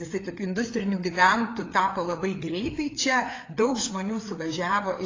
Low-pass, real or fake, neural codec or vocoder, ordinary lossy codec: 7.2 kHz; fake; codec, 16 kHz, 4 kbps, FreqCodec, larger model; AAC, 32 kbps